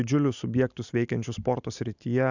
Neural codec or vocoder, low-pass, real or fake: none; 7.2 kHz; real